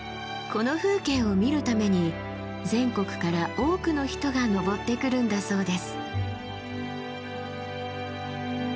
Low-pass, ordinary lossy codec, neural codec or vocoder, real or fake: none; none; none; real